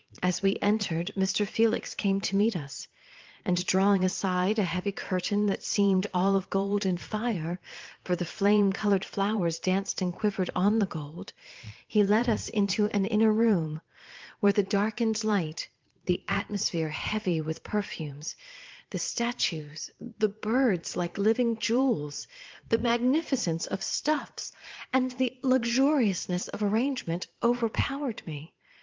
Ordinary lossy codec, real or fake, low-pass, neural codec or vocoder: Opus, 32 kbps; fake; 7.2 kHz; vocoder, 22.05 kHz, 80 mel bands, Vocos